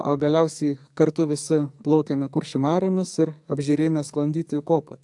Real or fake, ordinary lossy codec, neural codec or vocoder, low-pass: fake; AAC, 64 kbps; codec, 44.1 kHz, 2.6 kbps, SNAC; 10.8 kHz